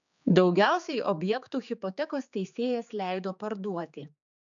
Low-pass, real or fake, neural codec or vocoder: 7.2 kHz; fake; codec, 16 kHz, 4 kbps, X-Codec, HuBERT features, trained on general audio